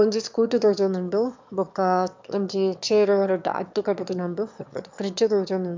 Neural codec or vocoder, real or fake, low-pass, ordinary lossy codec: autoencoder, 22.05 kHz, a latent of 192 numbers a frame, VITS, trained on one speaker; fake; 7.2 kHz; MP3, 64 kbps